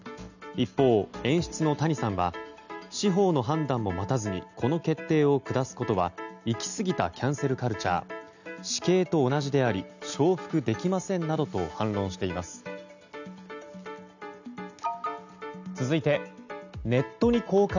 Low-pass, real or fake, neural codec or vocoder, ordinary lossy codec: 7.2 kHz; real; none; none